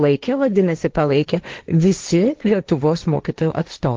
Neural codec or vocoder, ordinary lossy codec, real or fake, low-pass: codec, 16 kHz, 1.1 kbps, Voila-Tokenizer; Opus, 32 kbps; fake; 7.2 kHz